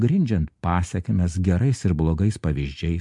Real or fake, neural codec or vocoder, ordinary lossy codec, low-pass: real; none; MP3, 64 kbps; 10.8 kHz